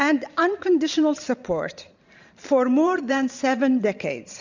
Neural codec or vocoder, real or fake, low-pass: vocoder, 44.1 kHz, 128 mel bands every 512 samples, BigVGAN v2; fake; 7.2 kHz